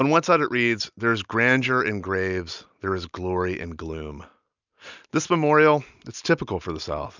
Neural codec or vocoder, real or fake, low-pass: none; real; 7.2 kHz